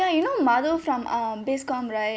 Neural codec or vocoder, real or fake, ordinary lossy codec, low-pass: none; real; none; none